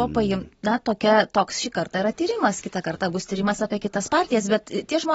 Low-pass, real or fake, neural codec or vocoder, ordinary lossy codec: 14.4 kHz; real; none; AAC, 24 kbps